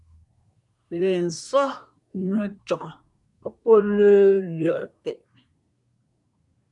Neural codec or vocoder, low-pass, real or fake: codec, 24 kHz, 1 kbps, SNAC; 10.8 kHz; fake